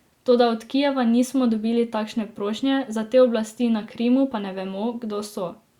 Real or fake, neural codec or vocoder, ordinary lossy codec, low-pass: real; none; Opus, 64 kbps; 19.8 kHz